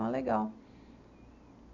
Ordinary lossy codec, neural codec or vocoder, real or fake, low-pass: none; none; real; 7.2 kHz